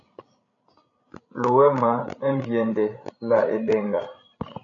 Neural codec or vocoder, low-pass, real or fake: codec, 16 kHz, 16 kbps, FreqCodec, larger model; 7.2 kHz; fake